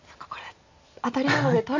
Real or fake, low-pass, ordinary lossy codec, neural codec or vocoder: real; 7.2 kHz; AAC, 48 kbps; none